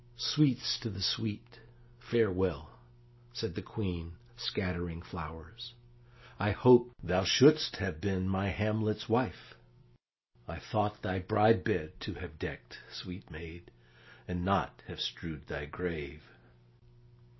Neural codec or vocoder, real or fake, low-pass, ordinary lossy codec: none; real; 7.2 kHz; MP3, 24 kbps